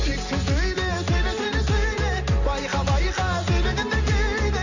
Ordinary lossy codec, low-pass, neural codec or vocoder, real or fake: none; 7.2 kHz; none; real